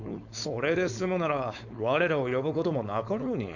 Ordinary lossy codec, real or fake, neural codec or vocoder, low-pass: none; fake; codec, 16 kHz, 4.8 kbps, FACodec; 7.2 kHz